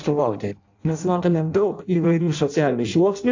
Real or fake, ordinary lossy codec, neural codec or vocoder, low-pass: fake; AAC, 48 kbps; codec, 16 kHz in and 24 kHz out, 0.6 kbps, FireRedTTS-2 codec; 7.2 kHz